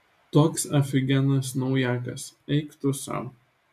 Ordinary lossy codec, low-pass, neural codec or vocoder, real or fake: MP3, 96 kbps; 14.4 kHz; none; real